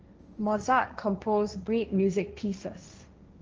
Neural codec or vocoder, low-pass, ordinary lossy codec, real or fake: codec, 16 kHz, 1.1 kbps, Voila-Tokenizer; 7.2 kHz; Opus, 24 kbps; fake